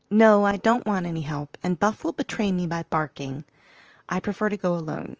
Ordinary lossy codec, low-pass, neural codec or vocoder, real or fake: Opus, 24 kbps; 7.2 kHz; vocoder, 44.1 kHz, 128 mel bands, Pupu-Vocoder; fake